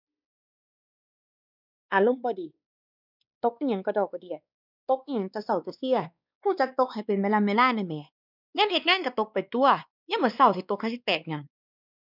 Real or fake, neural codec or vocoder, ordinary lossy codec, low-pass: fake; codec, 16 kHz, 2 kbps, X-Codec, WavLM features, trained on Multilingual LibriSpeech; none; 5.4 kHz